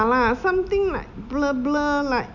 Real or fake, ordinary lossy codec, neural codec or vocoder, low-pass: real; none; none; 7.2 kHz